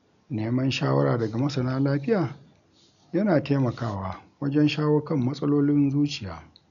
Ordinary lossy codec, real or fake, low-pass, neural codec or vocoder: none; real; 7.2 kHz; none